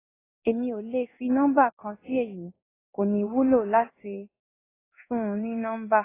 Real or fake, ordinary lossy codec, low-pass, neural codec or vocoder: fake; AAC, 16 kbps; 3.6 kHz; codec, 16 kHz in and 24 kHz out, 1 kbps, XY-Tokenizer